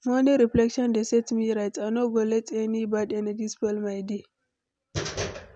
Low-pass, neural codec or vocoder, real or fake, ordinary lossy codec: 9.9 kHz; none; real; none